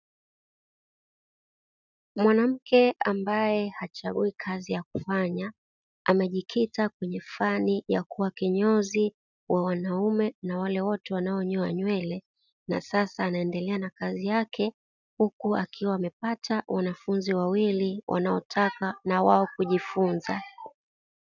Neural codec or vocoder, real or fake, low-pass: none; real; 7.2 kHz